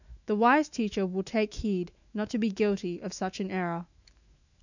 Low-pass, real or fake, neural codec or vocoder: 7.2 kHz; fake; autoencoder, 48 kHz, 128 numbers a frame, DAC-VAE, trained on Japanese speech